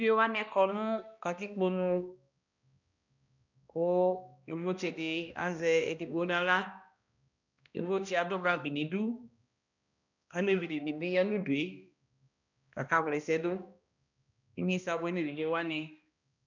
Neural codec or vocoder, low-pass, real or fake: codec, 16 kHz, 1 kbps, X-Codec, HuBERT features, trained on balanced general audio; 7.2 kHz; fake